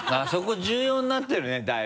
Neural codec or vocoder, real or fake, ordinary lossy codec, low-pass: none; real; none; none